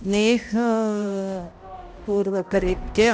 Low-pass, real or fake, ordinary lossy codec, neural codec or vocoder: none; fake; none; codec, 16 kHz, 0.5 kbps, X-Codec, HuBERT features, trained on balanced general audio